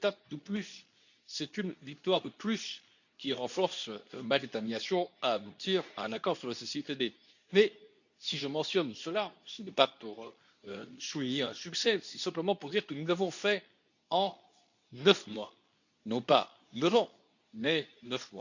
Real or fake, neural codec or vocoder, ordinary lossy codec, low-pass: fake; codec, 24 kHz, 0.9 kbps, WavTokenizer, medium speech release version 2; none; 7.2 kHz